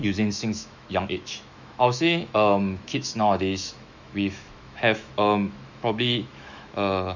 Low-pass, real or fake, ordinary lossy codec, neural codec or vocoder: 7.2 kHz; fake; none; autoencoder, 48 kHz, 128 numbers a frame, DAC-VAE, trained on Japanese speech